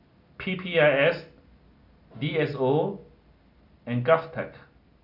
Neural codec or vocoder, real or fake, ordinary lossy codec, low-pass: none; real; none; 5.4 kHz